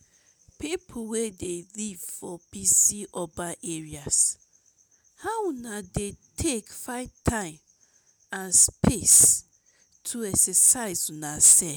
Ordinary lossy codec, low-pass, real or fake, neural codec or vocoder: none; none; real; none